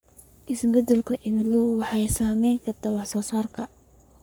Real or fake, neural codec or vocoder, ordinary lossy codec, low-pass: fake; codec, 44.1 kHz, 3.4 kbps, Pupu-Codec; none; none